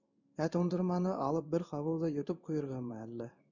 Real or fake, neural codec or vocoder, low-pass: fake; codec, 16 kHz in and 24 kHz out, 1 kbps, XY-Tokenizer; 7.2 kHz